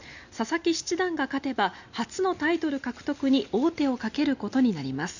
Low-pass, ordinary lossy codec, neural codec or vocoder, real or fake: 7.2 kHz; none; none; real